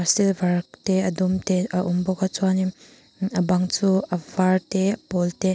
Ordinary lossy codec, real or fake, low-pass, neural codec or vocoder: none; real; none; none